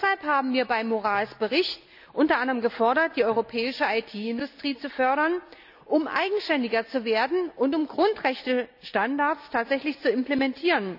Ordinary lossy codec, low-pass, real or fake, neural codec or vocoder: none; 5.4 kHz; real; none